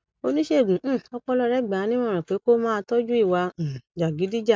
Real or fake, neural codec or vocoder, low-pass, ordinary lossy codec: real; none; none; none